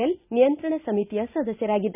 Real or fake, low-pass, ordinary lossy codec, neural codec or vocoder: real; 3.6 kHz; none; none